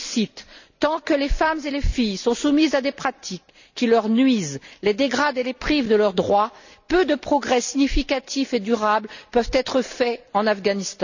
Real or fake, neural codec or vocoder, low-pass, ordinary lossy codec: real; none; 7.2 kHz; none